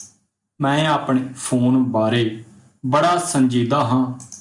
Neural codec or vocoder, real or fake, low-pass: none; real; 10.8 kHz